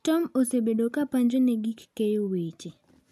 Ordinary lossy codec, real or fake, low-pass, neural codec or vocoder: none; real; 14.4 kHz; none